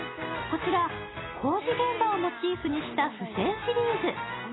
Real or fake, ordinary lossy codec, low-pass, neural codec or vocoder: real; AAC, 16 kbps; 7.2 kHz; none